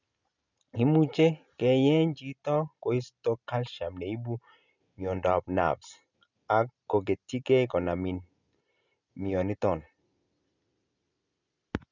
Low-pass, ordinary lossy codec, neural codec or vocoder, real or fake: 7.2 kHz; none; none; real